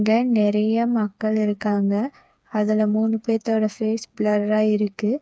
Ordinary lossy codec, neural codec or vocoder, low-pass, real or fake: none; codec, 16 kHz, 4 kbps, FreqCodec, smaller model; none; fake